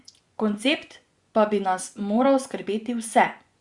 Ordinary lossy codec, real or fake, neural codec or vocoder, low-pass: Opus, 64 kbps; fake; vocoder, 24 kHz, 100 mel bands, Vocos; 10.8 kHz